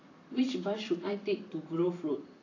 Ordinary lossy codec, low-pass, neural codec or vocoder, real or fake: AAC, 32 kbps; 7.2 kHz; vocoder, 44.1 kHz, 128 mel bands every 256 samples, BigVGAN v2; fake